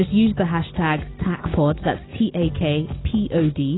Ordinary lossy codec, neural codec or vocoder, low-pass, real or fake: AAC, 16 kbps; none; 7.2 kHz; real